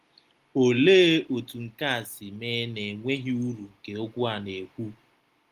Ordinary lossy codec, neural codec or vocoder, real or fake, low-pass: Opus, 24 kbps; none; real; 14.4 kHz